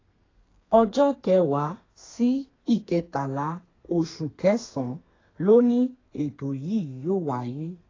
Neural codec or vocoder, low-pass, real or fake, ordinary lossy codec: codec, 32 kHz, 1.9 kbps, SNAC; 7.2 kHz; fake; AAC, 32 kbps